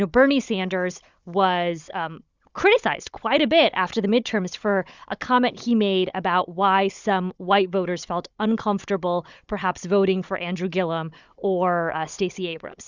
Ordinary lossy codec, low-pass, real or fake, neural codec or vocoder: Opus, 64 kbps; 7.2 kHz; fake; codec, 16 kHz, 16 kbps, FunCodec, trained on Chinese and English, 50 frames a second